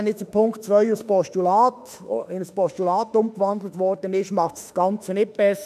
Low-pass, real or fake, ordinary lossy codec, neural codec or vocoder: 14.4 kHz; fake; none; autoencoder, 48 kHz, 32 numbers a frame, DAC-VAE, trained on Japanese speech